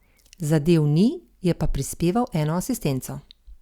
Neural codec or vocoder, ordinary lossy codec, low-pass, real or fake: vocoder, 44.1 kHz, 128 mel bands every 256 samples, BigVGAN v2; Opus, 64 kbps; 19.8 kHz; fake